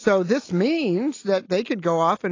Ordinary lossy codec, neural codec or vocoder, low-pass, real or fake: AAC, 32 kbps; none; 7.2 kHz; real